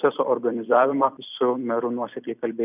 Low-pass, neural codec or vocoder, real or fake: 3.6 kHz; vocoder, 44.1 kHz, 128 mel bands every 256 samples, BigVGAN v2; fake